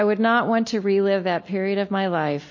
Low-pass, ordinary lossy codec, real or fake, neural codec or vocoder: 7.2 kHz; MP3, 32 kbps; real; none